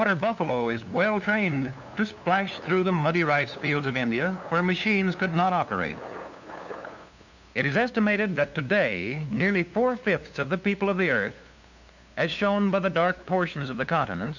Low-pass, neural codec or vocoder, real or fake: 7.2 kHz; codec, 16 kHz, 2 kbps, FunCodec, trained on LibriTTS, 25 frames a second; fake